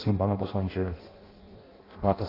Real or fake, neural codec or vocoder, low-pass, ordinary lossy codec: fake; codec, 16 kHz in and 24 kHz out, 0.6 kbps, FireRedTTS-2 codec; 5.4 kHz; AAC, 24 kbps